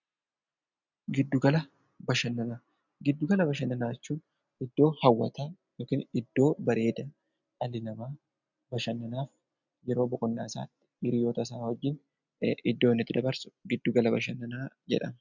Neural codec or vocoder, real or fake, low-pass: none; real; 7.2 kHz